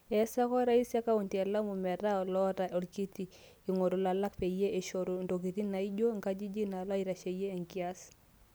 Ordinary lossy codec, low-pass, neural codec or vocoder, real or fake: none; none; none; real